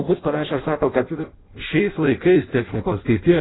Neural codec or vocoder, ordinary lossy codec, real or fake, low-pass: codec, 16 kHz in and 24 kHz out, 0.6 kbps, FireRedTTS-2 codec; AAC, 16 kbps; fake; 7.2 kHz